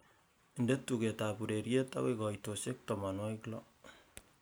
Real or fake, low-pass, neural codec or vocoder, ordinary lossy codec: real; none; none; none